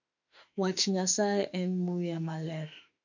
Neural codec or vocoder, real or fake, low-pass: autoencoder, 48 kHz, 32 numbers a frame, DAC-VAE, trained on Japanese speech; fake; 7.2 kHz